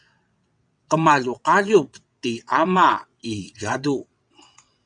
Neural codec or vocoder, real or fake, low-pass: vocoder, 22.05 kHz, 80 mel bands, WaveNeXt; fake; 9.9 kHz